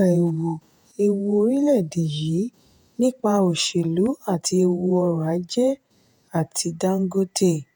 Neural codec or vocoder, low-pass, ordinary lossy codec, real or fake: vocoder, 48 kHz, 128 mel bands, Vocos; none; none; fake